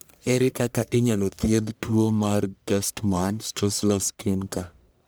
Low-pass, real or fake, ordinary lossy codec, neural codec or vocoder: none; fake; none; codec, 44.1 kHz, 1.7 kbps, Pupu-Codec